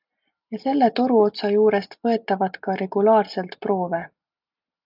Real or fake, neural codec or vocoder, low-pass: real; none; 5.4 kHz